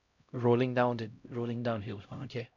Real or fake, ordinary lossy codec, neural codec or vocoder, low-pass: fake; none; codec, 16 kHz, 0.5 kbps, X-Codec, HuBERT features, trained on LibriSpeech; 7.2 kHz